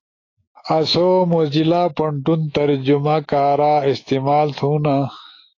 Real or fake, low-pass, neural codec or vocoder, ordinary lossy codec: fake; 7.2 kHz; autoencoder, 48 kHz, 128 numbers a frame, DAC-VAE, trained on Japanese speech; AAC, 32 kbps